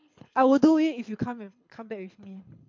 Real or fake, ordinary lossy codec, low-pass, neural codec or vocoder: fake; MP3, 48 kbps; 7.2 kHz; codec, 24 kHz, 6 kbps, HILCodec